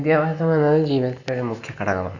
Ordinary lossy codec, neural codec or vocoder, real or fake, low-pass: none; none; real; 7.2 kHz